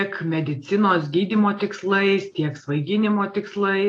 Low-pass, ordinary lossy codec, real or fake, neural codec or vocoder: 9.9 kHz; AAC, 48 kbps; real; none